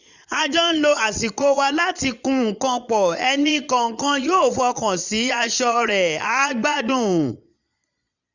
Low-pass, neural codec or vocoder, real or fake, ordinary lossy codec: 7.2 kHz; vocoder, 22.05 kHz, 80 mel bands, Vocos; fake; none